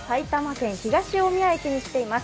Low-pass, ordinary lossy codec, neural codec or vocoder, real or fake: none; none; none; real